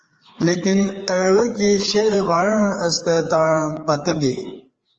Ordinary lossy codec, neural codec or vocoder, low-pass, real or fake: Opus, 24 kbps; codec, 16 kHz, 4 kbps, FreqCodec, larger model; 7.2 kHz; fake